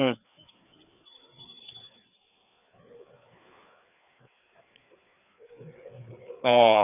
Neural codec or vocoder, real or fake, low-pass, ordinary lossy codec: codec, 16 kHz, 4 kbps, FreqCodec, larger model; fake; 3.6 kHz; none